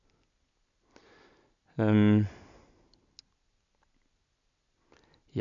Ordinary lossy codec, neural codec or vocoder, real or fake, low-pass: MP3, 96 kbps; none; real; 7.2 kHz